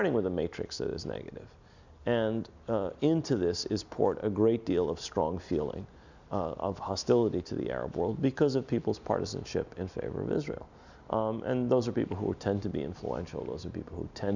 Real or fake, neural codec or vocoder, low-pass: real; none; 7.2 kHz